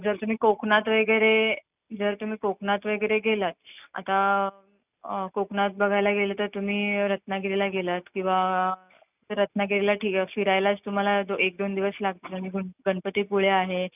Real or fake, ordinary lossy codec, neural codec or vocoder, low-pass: real; none; none; 3.6 kHz